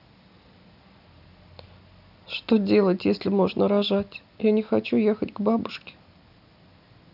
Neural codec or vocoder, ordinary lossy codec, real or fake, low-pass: none; none; real; 5.4 kHz